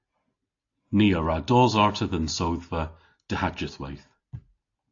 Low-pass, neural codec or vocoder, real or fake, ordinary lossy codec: 7.2 kHz; none; real; AAC, 48 kbps